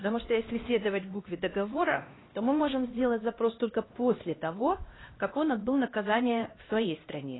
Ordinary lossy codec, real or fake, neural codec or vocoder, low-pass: AAC, 16 kbps; fake; codec, 16 kHz, 1 kbps, X-Codec, HuBERT features, trained on LibriSpeech; 7.2 kHz